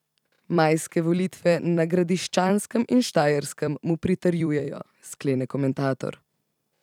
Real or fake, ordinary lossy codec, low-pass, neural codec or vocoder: fake; none; 19.8 kHz; vocoder, 44.1 kHz, 128 mel bands every 256 samples, BigVGAN v2